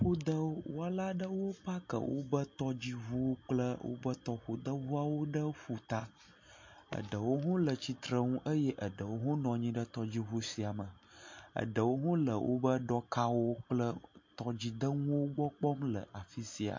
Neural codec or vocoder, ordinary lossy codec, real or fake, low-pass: none; MP3, 48 kbps; real; 7.2 kHz